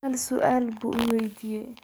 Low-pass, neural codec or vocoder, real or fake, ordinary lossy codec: none; codec, 44.1 kHz, 7.8 kbps, DAC; fake; none